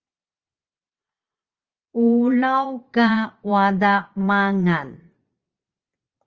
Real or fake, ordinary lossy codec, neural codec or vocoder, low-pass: fake; Opus, 24 kbps; vocoder, 22.05 kHz, 80 mel bands, Vocos; 7.2 kHz